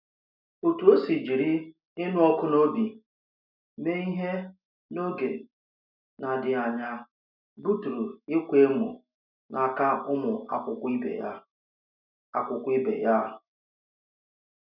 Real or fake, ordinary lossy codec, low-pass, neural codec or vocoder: real; none; 5.4 kHz; none